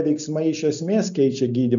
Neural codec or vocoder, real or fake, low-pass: none; real; 7.2 kHz